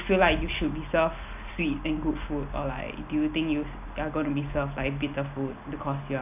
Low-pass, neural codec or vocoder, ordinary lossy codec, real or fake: 3.6 kHz; none; none; real